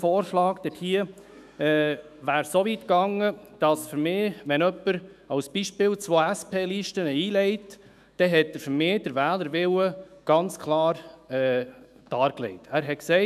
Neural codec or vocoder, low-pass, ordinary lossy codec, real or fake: autoencoder, 48 kHz, 128 numbers a frame, DAC-VAE, trained on Japanese speech; 14.4 kHz; none; fake